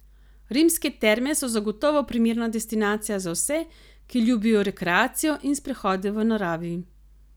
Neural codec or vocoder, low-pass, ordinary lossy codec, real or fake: none; none; none; real